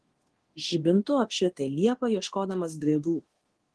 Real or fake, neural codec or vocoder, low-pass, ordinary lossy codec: fake; codec, 24 kHz, 0.9 kbps, DualCodec; 10.8 kHz; Opus, 16 kbps